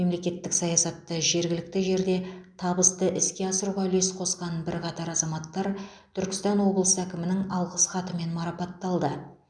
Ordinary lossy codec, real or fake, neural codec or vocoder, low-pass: none; real; none; 9.9 kHz